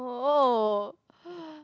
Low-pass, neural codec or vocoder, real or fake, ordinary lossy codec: none; none; real; none